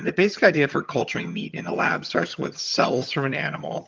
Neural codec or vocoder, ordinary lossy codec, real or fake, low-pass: vocoder, 22.05 kHz, 80 mel bands, HiFi-GAN; Opus, 24 kbps; fake; 7.2 kHz